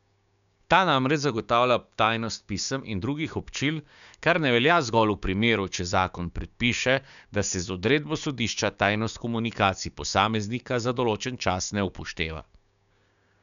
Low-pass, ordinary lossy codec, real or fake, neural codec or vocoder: 7.2 kHz; none; fake; codec, 16 kHz, 6 kbps, DAC